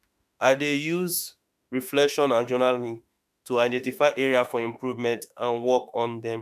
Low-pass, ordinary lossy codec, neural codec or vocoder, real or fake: 14.4 kHz; none; autoencoder, 48 kHz, 32 numbers a frame, DAC-VAE, trained on Japanese speech; fake